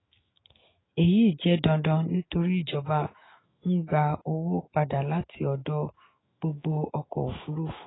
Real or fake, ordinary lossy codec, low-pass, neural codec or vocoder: fake; AAC, 16 kbps; 7.2 kHz; autoencoder, 48 kHz, 128 numbers a frame, DAC-VAE, trained on Japanese speech